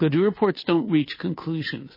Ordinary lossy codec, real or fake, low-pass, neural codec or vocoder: MP3, 24 kbps; fake; 5.4 kHz; codec, 16 kHz, 2 kbps, FunCodec, trained on Chinese and English, 25 frames a second